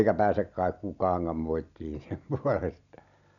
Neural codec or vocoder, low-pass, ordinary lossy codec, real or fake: none; 7.2 kHz; none; real